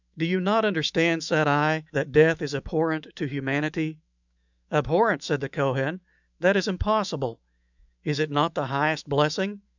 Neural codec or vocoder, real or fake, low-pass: autoencoder, 48 kHz, 128 numbers a frame, DAC-VAE, trained on Japanese speech; fake; 7.2 kHz